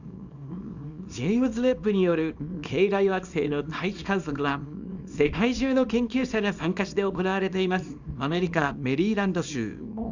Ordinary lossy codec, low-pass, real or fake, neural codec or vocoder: none; 7.2 kHz; fake; codec, 24 kHz, 0.9 kbps, WavTokenizer, small release